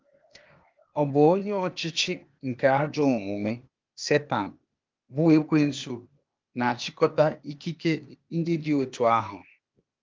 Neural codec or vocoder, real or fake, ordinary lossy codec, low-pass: codec, 16 kHz, 0.8 kbps, ZipCodec; fake; Opus, 24 kbps; 7.2 kHz